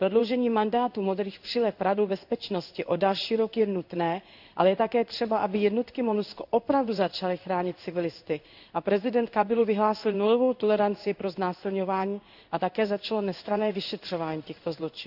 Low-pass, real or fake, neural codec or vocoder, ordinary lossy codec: 5.4 kHz; fake; codec, 16 kHz in and 24 kHz out, 1 kbps, XY-Tokenizer; none